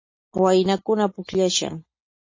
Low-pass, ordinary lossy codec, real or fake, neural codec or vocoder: 7.2 kHz; MP3, 32 kbps; real; none